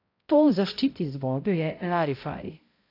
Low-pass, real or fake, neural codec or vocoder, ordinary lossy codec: 5.4 kHz; fake; codec, 16 kHz, 0.5 kbps, X-Codec, HuBERT features, trained on balanced general audio; AAC, 32 kbps